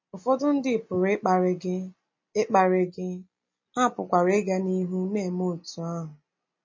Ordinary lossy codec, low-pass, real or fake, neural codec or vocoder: MP3, 32 kbps; 7.2 kHz; real; none